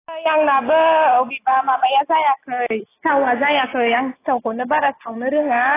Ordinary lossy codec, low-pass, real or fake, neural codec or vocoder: AAC, 16 kbps; 3.6 kHz; real; none